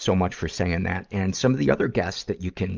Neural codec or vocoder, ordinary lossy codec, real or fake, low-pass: vocoder, 44.1 kHz, 128 mel bands every 512 samples, BigVGAN v2; Opus, 24 kbps; fake; 7.2 kHz